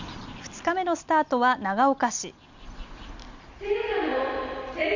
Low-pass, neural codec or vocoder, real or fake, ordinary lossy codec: 7.2 kHz; none; real; none